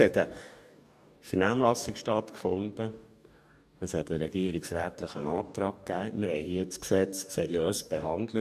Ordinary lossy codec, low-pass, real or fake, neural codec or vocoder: none; 14.4 kHz; fake; codec, 44.1 kHz, 2.6 kbps, DAC